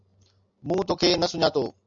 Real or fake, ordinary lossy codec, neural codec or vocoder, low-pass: real; AAC, 64 kbps; none; 7.2 kHz